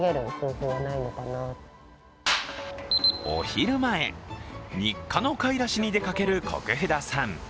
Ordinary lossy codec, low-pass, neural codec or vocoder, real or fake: none; none; none; real